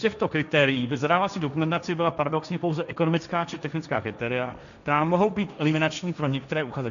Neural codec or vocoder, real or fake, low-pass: codec, 16 kHz, 1.1 kbps, Voila-Tokenizer; fake; 7.2 kHz